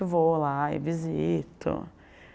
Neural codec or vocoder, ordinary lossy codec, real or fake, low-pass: none; none; real; none